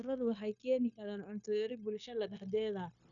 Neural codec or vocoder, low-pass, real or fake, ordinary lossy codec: codec, 16 kHz, 0.9 kbps, LongCat-Audio-Codec; 7.2 kHz; fake; MP3, 96 kbps